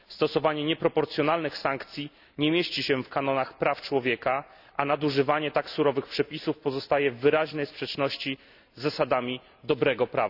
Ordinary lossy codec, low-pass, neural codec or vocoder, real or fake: none; 5.4 kHz; none; real